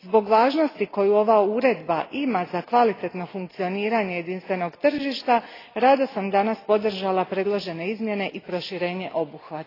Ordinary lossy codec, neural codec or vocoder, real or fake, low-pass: AAC, 24 kbps; none; real; 5.4 kHz